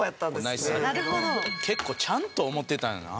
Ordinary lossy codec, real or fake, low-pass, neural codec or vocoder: none; real; none; none